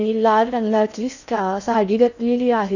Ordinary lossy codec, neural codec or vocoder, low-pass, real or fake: none; codec, 16 kHz in and 24 kHz out, 0.6 kbps, FocalCodec, streaming, 4096 codes; 7.2 kHz; fake